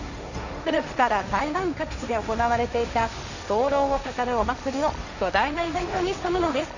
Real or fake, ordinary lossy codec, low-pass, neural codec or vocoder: fake; none; 7.2 kHz; codec, 16 kHz, 1.1 kbps, Voila-Tokenizer